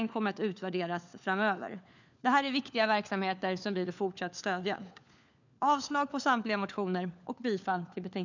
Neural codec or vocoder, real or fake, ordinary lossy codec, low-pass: codec, 16 kHz, 4 kbps, FunCodec, trained on LibriTTS, 50 frames a second; fake; none; 7.2 kHz